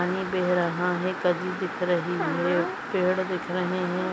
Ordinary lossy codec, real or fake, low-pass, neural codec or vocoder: none; real; none; none